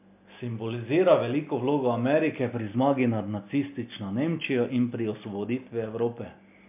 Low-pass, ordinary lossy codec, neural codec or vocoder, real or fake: 3.6 kHz; MP3, 32 kbps; none; real